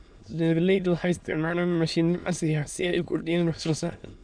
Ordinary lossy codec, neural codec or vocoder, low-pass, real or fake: none; autoencoder, 22.05 kHz, a latent of 192 numbers a frame, VITS, trained on many speakers; 9.9 kHz; fake